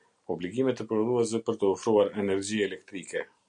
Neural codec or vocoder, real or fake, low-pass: none; real; 9.9 kHz